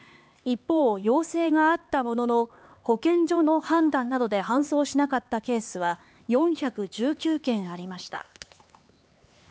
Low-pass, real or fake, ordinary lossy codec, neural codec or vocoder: none; fake; none; codec, 16 kHz, 4 kbps, X-Codec, HuBERT features, trained on LibriSpeech